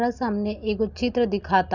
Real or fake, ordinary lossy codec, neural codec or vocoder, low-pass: real; none; none; 7.2 kHz